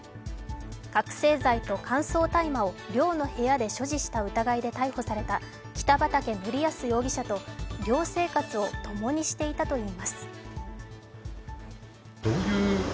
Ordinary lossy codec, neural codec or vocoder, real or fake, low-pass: none; none; real; none